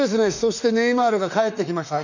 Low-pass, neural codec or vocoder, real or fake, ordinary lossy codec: 7.2 kHz; autoencoder, 48 kHz, 32 numbers a frame, DAC-VAE, trained on Japanese speech; fake; none